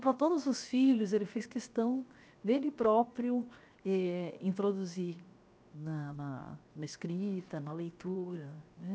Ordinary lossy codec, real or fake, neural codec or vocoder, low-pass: none; fake; codec, 16 kHz, 0.7 kbps, FocalCodec; none